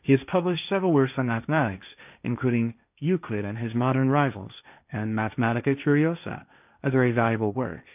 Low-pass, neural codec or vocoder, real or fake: 3.6 kHz; codec, 16 kHz, 1.1 kbps, Voila-Tokenizer; fake